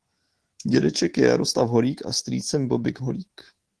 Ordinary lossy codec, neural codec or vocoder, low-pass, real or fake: Opus, 16 kbps; codec, 24 kHz, 3.1 kbps, DualCodec; 10.8 kHz; fake